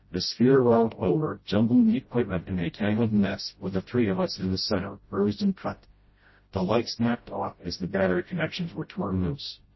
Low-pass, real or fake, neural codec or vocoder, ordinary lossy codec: 7.2 kHz; fake; codec, 16 kHz, 0.5 kbps, FreqCodec, smaller model; MP3, 24 kbps